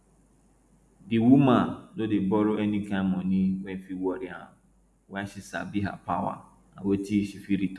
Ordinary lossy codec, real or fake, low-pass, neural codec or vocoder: none; real; none; none